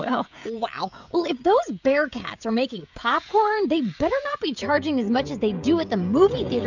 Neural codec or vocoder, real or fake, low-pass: codec, 16 kHz, 16 kbps, FreqCodec, smaller model; fake; 7.2 kHz